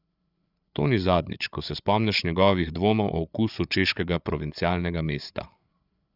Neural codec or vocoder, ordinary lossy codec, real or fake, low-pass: codec, 16 kHz, 8 kbps, FreqCodec, larger model; none; fake; 5.4 kHz